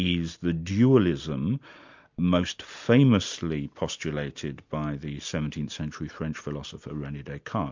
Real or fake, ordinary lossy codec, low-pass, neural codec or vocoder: real; MP3, 64 kbps; 7.2 kHz; none